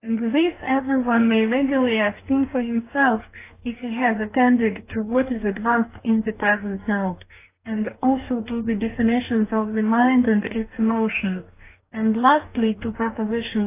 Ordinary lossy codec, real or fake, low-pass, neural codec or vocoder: AAC, 24 kbps; fake; 3.6 kHz; codec, 44.1 kHz, 2.6 kbps, DAC